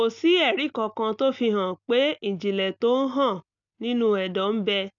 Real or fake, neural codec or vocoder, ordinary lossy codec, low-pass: real; none; none; 7.2 kHz